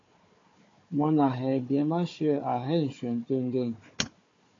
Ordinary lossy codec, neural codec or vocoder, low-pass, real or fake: AAC, 32 kbps; codec, 16 kHz, 4 kbps, FunCodec, trained on Chinese and English, 50 frames a second; 7.2 kHz; fake